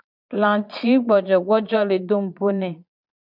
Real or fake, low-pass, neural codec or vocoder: fake; 5.4 kHz; vocoder, 22.05 kHz, 80 mel bands, Vocos